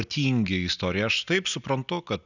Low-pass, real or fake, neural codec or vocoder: 7.2 kHz; real; none